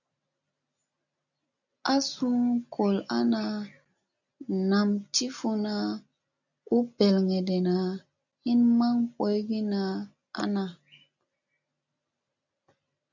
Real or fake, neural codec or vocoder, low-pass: real; none; 7.2 kHz